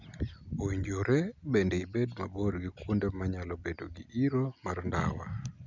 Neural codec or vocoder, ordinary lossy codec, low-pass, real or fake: none; none; 7.2 kHz; real